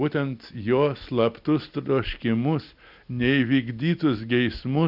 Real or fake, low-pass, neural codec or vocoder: real; 5.4 kHz; none